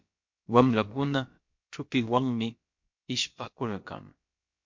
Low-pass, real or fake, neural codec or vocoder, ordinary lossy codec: 7.2 kHz; fake; codec, 16 kHz, about 1 kbps, DyCAST, with the encoder's durations; MP3, 48 kbps